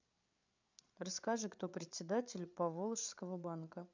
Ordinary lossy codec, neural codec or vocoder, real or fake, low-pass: MP3, 64 kbps; codec, 16 kHz, 8 kbps, FreqCodec, larger model; fake; 7.2 kHz